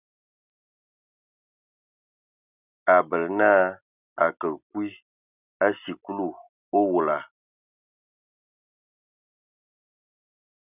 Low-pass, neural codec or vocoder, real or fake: 3.6 kHz; none; real